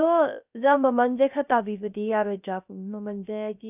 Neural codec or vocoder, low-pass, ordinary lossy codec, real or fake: codec, 16 kHz, 0.3 kbps, FocalCodec; 3.6 kHz; none; fake